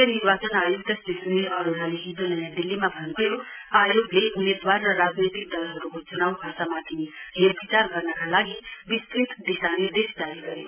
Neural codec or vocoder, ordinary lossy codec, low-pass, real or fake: none; none; 3.6 kHz; real